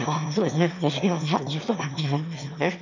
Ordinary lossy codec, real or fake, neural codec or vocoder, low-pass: none; fake; autoencoder, 22.05 kHz, a latent of 192 numbers a frame, VITS, trained on one speaker; 7.2 kHz